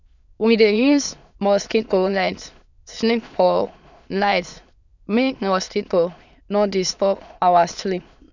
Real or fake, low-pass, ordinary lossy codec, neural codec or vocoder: fake; 7.2 kHz; none; autoencoder, 22.05 kHz, a latent of 192 numbers a frame, VITS, trained on many speakers